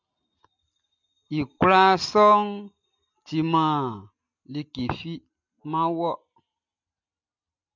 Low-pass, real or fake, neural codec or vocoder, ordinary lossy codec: 7.2 kHz; real; none; MP3, 64 kbps